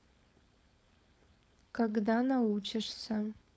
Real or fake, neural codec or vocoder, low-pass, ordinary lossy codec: fake; codec, 16 kHz, 4.8 kbps, FACodec; none; none